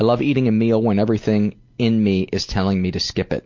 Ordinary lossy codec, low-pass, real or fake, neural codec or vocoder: MP3, 48 kbps; 7.2 kHz; real; none